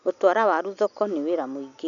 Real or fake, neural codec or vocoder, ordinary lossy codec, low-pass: real; none; none; 7.2 kHz